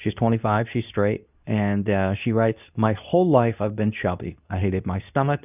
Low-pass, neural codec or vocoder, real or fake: 3.6 kHz; codec, 24 kHz, 0.9 kbps, WavTokenizer, medium speech release version 2; fake